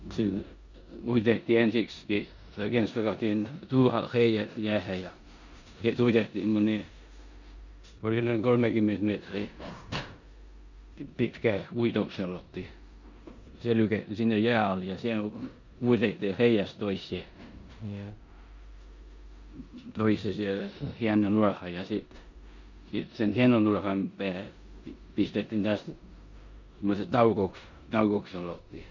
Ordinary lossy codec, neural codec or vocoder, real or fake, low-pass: none; codec, 16 kHz in and 24 kHz out, 0.9 kbps, LongCat-Audio-Codec, four codebook decoder; fake; 7.2 kHz